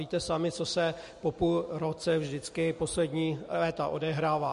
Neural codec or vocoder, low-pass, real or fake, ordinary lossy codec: none; 14.4 kHz; real; MP3, 48 kbps